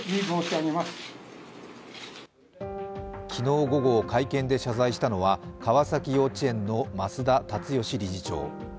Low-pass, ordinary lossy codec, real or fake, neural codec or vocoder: none; none; real; none